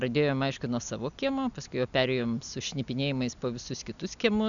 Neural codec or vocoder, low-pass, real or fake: none; 7.2 kHz; real